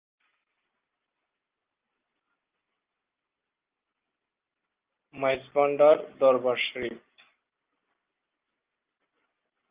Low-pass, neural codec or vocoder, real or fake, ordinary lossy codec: 3.6 kHz; none; real; Opus, 24 kbps